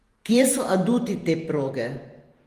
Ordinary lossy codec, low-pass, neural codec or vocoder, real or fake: Opus, 24 kbps; 14.4 kHz; vocoder, 48 kHz, 128 mel bands, Vocos; fake